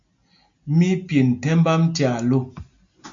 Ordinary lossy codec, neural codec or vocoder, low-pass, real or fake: MP3, 48 kbps; none; 7.2 kHz; real